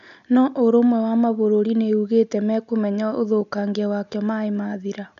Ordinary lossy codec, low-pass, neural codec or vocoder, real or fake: none; 7.2 kHz; none; real